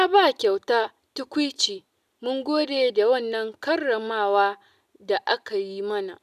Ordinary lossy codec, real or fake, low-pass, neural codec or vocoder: none; real; 14.4 kHz; none